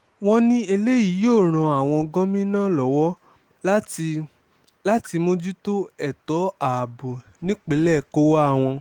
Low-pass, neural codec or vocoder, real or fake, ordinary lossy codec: 19.8 kHz; autoencoder, 48 kHz, 128 numbers a frame, DAC-VAE, trained on Japanese speech; fake; Opus, 24 kbps